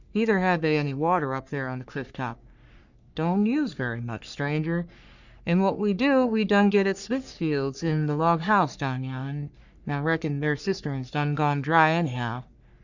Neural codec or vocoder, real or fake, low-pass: codec, 44.1 kHz, 3.4 kbps, Pupu-Codec; fake; 7.2 kHz